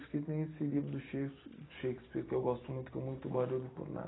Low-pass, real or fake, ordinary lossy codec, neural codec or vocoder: 7.2 kHz; fake; AAC, 16 kbps; vocoder, 44.1 kHz, 128 mel bands every 256 samples, BigVGAN v2